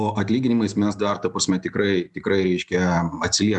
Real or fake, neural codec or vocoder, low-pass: real; none; 10.8 kHz